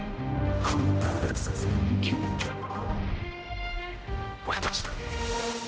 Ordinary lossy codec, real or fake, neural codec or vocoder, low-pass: none; fake; codec, 16 kHz, 0.5 kbps, X-Codec, HuBERT features, trained on general audio; none